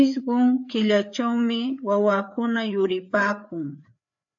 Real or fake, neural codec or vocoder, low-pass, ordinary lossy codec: fake; codec, 16 kHz, 4 kbps, FreqCodec, larger model; 7.2 kHz; AAC, 64 kbps